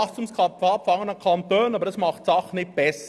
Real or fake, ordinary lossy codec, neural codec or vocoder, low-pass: real; none; none; none